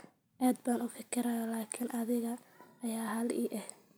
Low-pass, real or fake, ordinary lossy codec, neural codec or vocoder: none; real; none; none